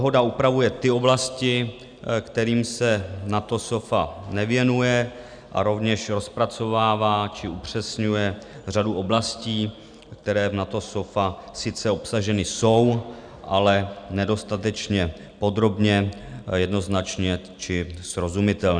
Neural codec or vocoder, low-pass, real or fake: none; 9.9 kHz; real